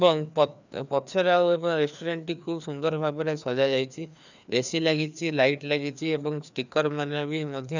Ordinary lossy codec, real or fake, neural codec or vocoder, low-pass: none; fake; codec, 16 kHz, 2 kbps, FreqCodec, larger model; 7.2 kHz